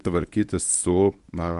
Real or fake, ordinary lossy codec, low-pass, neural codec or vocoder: fake; AAC, 96 kbps; 10.8 kHz; codec, 24 kHz, 0.9 kbps, WavTokenizer, medium speech release version 1